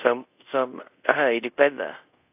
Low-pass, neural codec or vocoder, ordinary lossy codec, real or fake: 3.6 kHz; codec, 24 kHz, 0.5 kbps, DualCodec; AAC, 32 kbps; fake